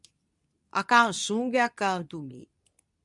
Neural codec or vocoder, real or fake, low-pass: codec, 24 kHz, 0.9 kbps, WavTokenizer, medium speech release version 2; fake; 10.8 kHz